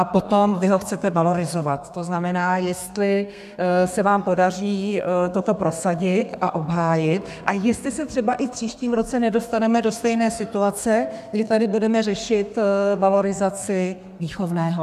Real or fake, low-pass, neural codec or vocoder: fake; 14.4 kHz; codec, 32 kHz, 1.9 kbps, SNAC